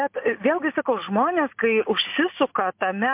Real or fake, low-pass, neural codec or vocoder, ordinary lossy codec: real; 3.6 kHz; none; MP3, 32 kbps